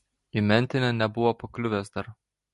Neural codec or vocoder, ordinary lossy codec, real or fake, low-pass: none; MP3, 48 kbps; real; 14.4 kHz